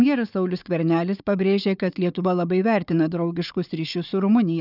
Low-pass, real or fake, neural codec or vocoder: 5.4 kHz; fake; codec, 16 kHz, 4 kbps, FunCodec, trained on Chinese and English, 50 frames a second